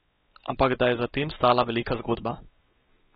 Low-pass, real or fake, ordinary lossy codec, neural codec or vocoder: 7.2 kHz; fake; AAC, 16 kbps; codec, 16 kHz, 4 kbps, X-Codec, WavLM features, trained on Multilingual LibriSpeech